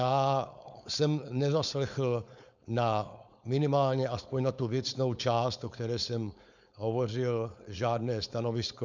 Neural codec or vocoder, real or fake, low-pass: codec, 16 kHz, 4.8 kbps, FACodec; fake; 7.2 kHz